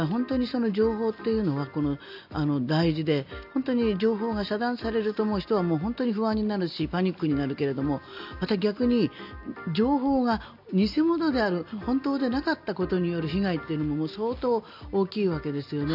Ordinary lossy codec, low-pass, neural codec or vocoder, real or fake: none; 5.4 kHz; none; real